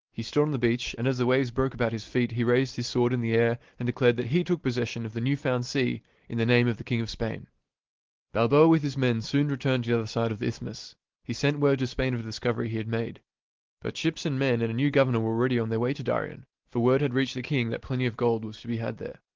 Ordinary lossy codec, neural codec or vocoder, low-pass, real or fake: Opus, 16 kbps; none; 7.2 kHz; real